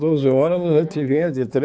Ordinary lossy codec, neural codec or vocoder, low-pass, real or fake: none; codec, 16 kHz, 4 kbps, X-Codec, HuBERT features, trained on balanced general audio; none; fake